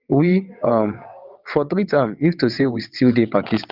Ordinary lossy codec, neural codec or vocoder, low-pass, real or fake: Opus, 32 kbps; vocoder, 22.05 kHz, 80 mel bands, WaveNeXt; 5.4 kHz; fake